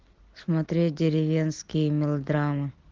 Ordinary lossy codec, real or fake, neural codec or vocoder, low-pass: Opus, 16 kbps; real; none; 7.2 kHz